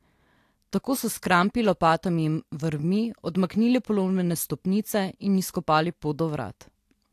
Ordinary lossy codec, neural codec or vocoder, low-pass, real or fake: AAC, 64 kbps; none; 14.4 kHz; real